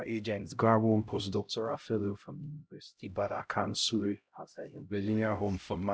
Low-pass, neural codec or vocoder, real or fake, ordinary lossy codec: none; codec, 16 kHz, 0.5 kbps, X-Codec, HuBERT features, trained on LibriSpeech; fake; none